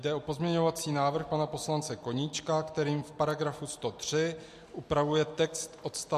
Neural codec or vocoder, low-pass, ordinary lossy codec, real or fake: none; 14.4 kHz; MP3, 64 kbps; real